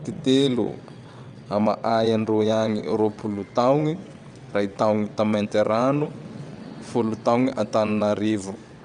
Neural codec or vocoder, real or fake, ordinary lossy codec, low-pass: vocoder, 22.05 kHz, 80 mel bands, WaveNeXt; fake; none; 9.9 kHz